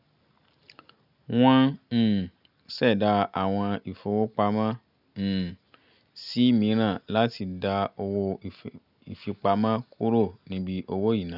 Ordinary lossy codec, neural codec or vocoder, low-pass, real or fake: none; none; 5.4 kHz; real